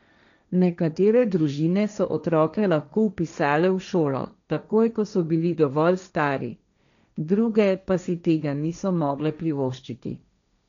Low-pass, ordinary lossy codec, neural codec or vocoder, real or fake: 7.2 kHz; none; codec, 16 kHz, 1.1 kbps, Voila-Tokenizer; fake